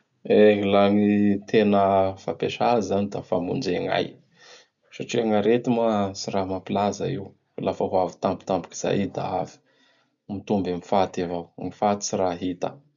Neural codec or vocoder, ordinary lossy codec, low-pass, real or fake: none; none; 7.2 kHz; real